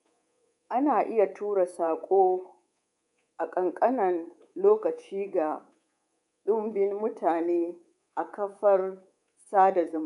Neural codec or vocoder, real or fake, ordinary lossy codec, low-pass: codec, 24 kHz, 3.1 kbps, DualCodec; fake; none; 10.8 kHz